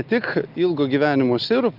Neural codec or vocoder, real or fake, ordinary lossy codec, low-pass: none; real; Opus, 32 kbps; 5.4 kHz